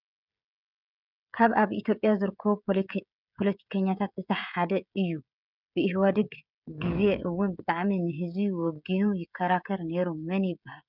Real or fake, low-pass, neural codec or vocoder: fake; 5.4 kHz; codec, 16 kHz, 16 kbps, FreqCodec, smaller model